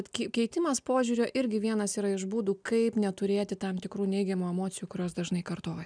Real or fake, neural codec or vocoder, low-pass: real; none; 9.9 kHz